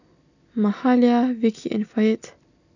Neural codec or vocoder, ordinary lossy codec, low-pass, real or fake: none; none; 7.2 kHz; real